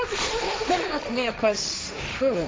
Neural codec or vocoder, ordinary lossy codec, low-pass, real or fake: codec, 16 kHz, 1.1 kbps, Voila-Tokenizer; none; none; fake